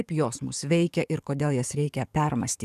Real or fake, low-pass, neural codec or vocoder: fake; 14.4 kHz; codec, 44.1 kHz, 7.8 kbps, DAC